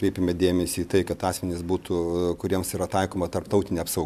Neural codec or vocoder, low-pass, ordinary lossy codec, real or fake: none; 14.4 kHz; MP3, 96 kbps; real